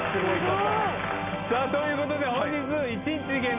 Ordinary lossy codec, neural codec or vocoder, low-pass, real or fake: none; none; 3.6 kHz; real